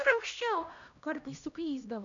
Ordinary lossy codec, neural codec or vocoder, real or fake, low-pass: MP3, 64 kbps; codec, 16 kHz, 1 kbps, X-Codec, HuBERT features, trained on LibriSpeech; fake; 7.2 kHz